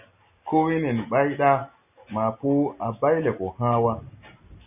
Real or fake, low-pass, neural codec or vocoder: real; 3.6 kHz; none